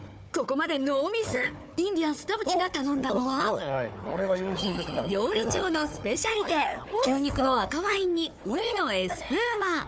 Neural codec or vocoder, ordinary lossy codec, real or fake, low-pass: codec, 16 kHz, 4 kbps, FunCodec, trained on Chinese and English, 50 frames a second; none; fake; none